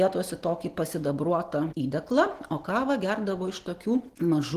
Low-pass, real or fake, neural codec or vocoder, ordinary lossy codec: 14.4 kHz; real; none; Opus, 16 kbps